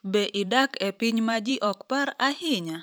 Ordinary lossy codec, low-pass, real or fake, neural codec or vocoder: none; none; fake; vocoder, 44.1 kHz, 128 mel bands every 512 samples, BigVGAN v2